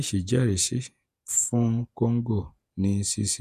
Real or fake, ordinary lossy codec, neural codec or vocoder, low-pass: fake; none; vocoder, 48 kHz, 128 mel bands, Vocos; 14.4 kHz